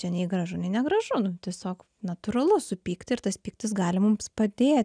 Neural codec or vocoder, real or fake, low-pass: none; real; 9.9 kHz